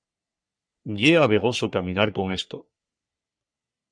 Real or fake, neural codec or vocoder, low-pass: fake; codec, 44.1 kHz, 3.4 kbps, Pupu-Codec; 9.9 kHz